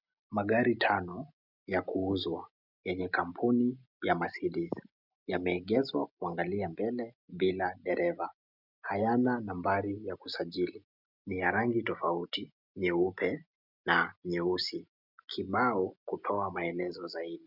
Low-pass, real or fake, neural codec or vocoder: 5.4 kHz; real; none